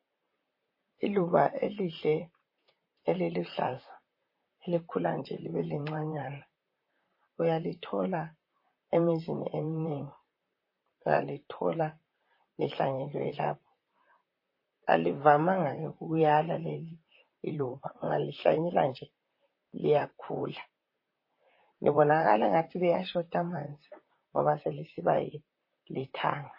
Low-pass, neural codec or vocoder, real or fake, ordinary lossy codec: 5.4 kHz; vocoder, 44.1 kHz, 128 mel bands, Pupu-Vocoder; fake; MP3, 24 kbps